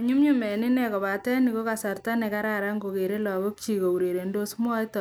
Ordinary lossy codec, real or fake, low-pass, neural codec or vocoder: none; real; none; none